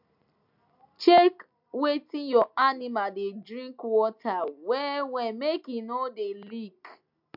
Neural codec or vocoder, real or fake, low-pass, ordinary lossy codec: none; real; 5.4 kHz; MP3, 48 kbps